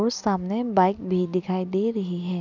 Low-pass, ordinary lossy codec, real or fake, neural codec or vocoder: 7.2 kHz; none; real; none